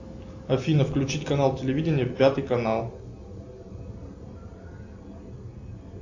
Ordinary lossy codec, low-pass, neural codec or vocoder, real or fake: AAC, 48 kbps; 7.2 kHz; none; real